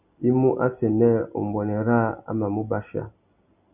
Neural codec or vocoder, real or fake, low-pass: none; real; 3.6 kHz